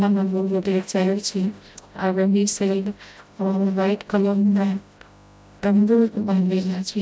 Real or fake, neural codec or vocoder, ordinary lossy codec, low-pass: fake; codec, 16 kHz, 0.5 kbps, FreqCodec, smaller model; none; none